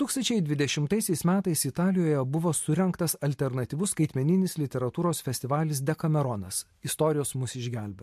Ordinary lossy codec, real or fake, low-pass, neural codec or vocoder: MP3, 64 kbps; real; 14.4 kHz; none